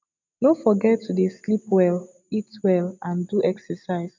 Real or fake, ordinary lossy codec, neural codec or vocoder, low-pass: real; MP3, 64 kbps; none; 7.2 kHz